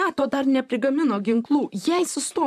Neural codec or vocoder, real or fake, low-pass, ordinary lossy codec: vocoder, 44.1 kHz, 128 mel bands, Pupu-Vocoder; fake; 14.4 kHz; MP3, 96 kbps